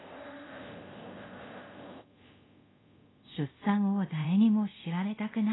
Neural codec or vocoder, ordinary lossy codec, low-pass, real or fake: codec, 24 kHz, 0.5 kbps, DualCodec; AAC, 16 kbps; 7.2 kHz; fake